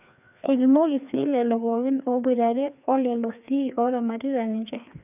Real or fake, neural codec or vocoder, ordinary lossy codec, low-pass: fake; codec, 16 kHz, 2 kbps, FreqCodec, larger model; none; 3.6 kHz